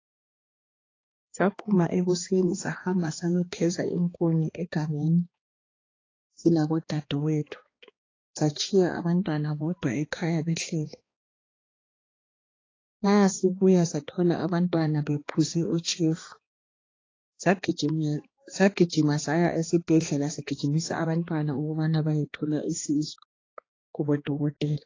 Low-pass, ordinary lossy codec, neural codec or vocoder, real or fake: 7.2 kHz; AAC, 32 kbps; codec, 16 kHz, 2 kbps, X-Codec, HuBERT features, trained on balanced general audio; fake